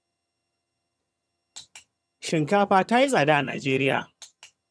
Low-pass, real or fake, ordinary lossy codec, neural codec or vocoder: none; fake; none; vocoder, 22.05 kHz, 80 mel bands, HiFi-GAN